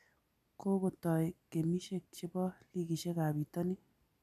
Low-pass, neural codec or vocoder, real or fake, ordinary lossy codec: 14.4 kHz; none; real; none